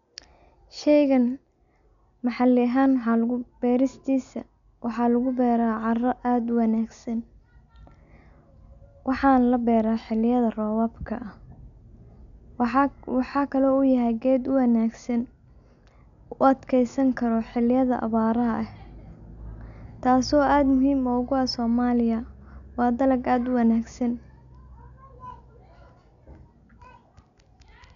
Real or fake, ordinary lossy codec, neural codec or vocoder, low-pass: real; none; none; 7.2 kHz